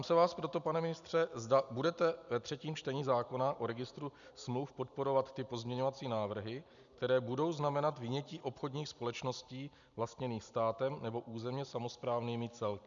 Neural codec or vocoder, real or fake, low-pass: none; real; 7.2 kHz